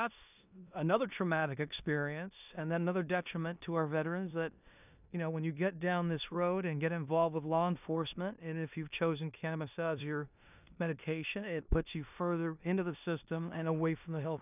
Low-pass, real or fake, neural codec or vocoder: 3.6 kHz; fake; codec, 16 kHz in and 24 kHz out, 0.9 kbps, LongCat-Audio-Codec, four codebook decoder